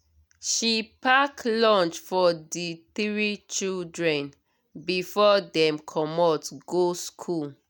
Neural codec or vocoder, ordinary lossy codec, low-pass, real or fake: none; none; none; real